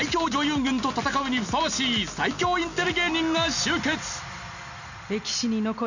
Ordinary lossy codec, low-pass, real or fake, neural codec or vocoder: none; 7.2 kHz; real; none